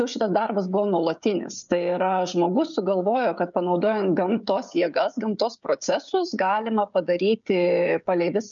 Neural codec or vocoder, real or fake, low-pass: codec, 16 kHz, 16 kbps, FreqCodec, smaller model; fake; 7.2 kHz